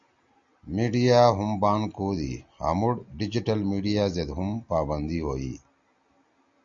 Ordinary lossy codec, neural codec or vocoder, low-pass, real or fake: Opus, 64 kbps; none; 7.2 kHz; real